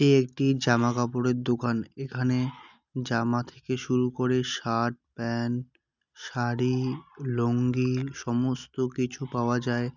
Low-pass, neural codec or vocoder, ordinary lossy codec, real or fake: 7.2 kHz; none; none; real